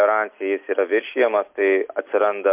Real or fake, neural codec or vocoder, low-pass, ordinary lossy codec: real; none; 3.6 kHz; MP3, 32 kbps